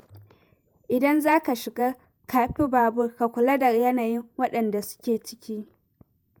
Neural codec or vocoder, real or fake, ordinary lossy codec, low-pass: vocoder, 48 kHz, 128 mel bands, Vocos; fake; none; none